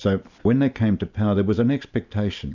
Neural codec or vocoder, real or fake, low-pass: none; real; 7.2 kHz